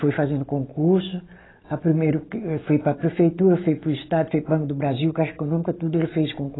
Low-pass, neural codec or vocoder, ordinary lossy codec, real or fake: 7.2 kHz; none; AAC, 16 kbps; real